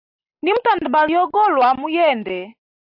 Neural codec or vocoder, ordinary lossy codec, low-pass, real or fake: none; AAC, 48 kbps; 5.4 kHz; real